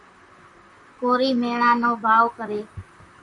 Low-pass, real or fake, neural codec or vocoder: 10.8 kHz; fake; vocoder, 44.1 kHz, 128 mel bands, Pupu-Vocoder